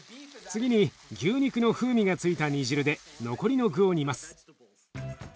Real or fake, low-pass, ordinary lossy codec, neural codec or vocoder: real; none; none; none